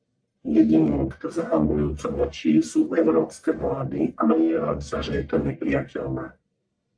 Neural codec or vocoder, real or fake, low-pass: codec, 44.1 kHz, 1.7 kbps, Pupu-Codec; fake; 9.9 kHz